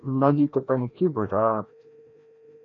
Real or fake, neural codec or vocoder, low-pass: fake; codec, 16 kHz, 1 kbps, FreqCodec, larger model; 7.2 kHz